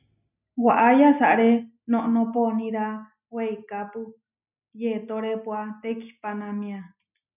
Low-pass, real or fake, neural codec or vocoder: 3.6 kHz; real; none